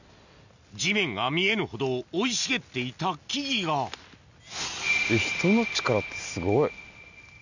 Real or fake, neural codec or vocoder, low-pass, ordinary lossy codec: real; none; 7.2 kHz; none